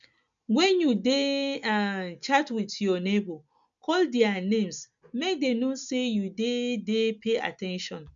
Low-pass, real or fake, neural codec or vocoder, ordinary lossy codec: 7.2 kHz; real; none; MP3, 64 kbps